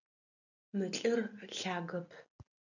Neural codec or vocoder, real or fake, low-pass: none; real; 7.2 kHz